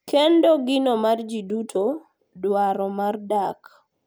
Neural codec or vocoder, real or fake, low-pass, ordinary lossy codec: none; real; none; none